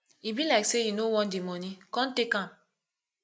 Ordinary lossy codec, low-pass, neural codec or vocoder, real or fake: none; none; none; real